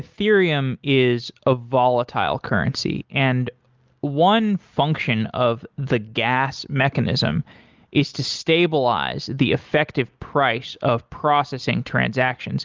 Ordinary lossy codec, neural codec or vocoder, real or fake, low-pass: Opus, 24 kbps; none; real; 7.2 kHz